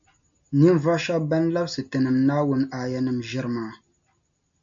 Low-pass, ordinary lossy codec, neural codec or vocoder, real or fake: 7.2 kHz; MP3, 64 kbps; none; real